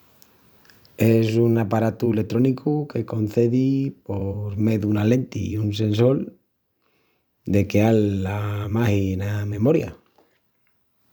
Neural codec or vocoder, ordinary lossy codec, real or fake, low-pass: vocoder, 44.1 kHz, 128 mel bands every 512 samples, BigVGAN v2; none; fake; none